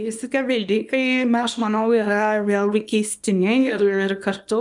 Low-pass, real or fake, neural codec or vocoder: 10.8 kHz; fake; codec, 24 kHz, 0.9 kbps, WavTokenizer, small release